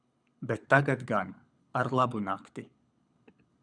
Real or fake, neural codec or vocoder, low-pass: fake; codec, 24 kHz, 6 kbps, HILCodec; 9.9 kHz